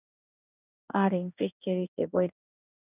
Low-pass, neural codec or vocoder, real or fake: 3.6 kHz; codec, 16 kHz in and 24 kHz out, 1 kbps, XY-Tokenizer; fake